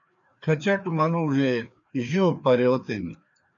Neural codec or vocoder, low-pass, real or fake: codec, 16 kHz, 2 kbps, FreqCodec, larger model; 7.2 kHz; fake